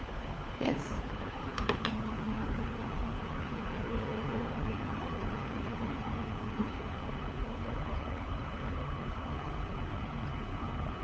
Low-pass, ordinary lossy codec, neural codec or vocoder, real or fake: none; none; codec, 16 kHz, 4 kbps, FunCodec, trained on LibriTTS, 50 frames a second; fake